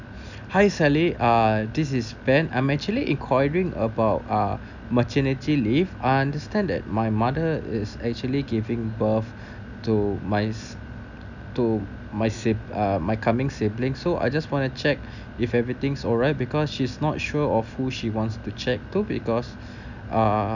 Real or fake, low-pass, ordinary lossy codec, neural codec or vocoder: real; 7.2 kHz; none; none